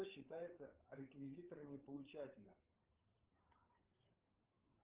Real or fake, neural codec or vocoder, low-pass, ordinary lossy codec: fake; codec, 16 kHz, 8 kbps, FreqCodec, larger model; 3.6 kHz; Opus, 16 kbps